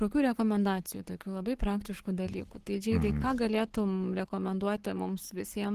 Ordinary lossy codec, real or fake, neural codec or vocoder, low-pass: Opus, 16 kbps; fake; codec, 44.1 kHz, 7.8 kbps, Pupu-Codec; 14.4 kHz